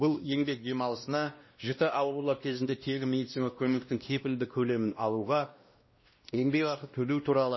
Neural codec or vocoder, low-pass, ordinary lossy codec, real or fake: codec, 16 kHz, 1 kbps, X-Codec, WavLM features, trained on Multilingual LibriSpeech; 7.2 kHz; MP3, 24 kbps; fake